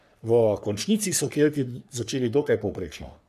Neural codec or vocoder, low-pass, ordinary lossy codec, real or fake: codec, 44.1 kHz, 3.4 kbps, Pupu-Codec; 14.4 kHz; none; fake